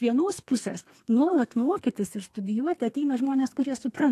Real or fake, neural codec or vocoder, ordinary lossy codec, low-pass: fake; codec, 32 kHz, 1.9 kbps, SNAC; AAC, 64 kbps; 14.4 kHz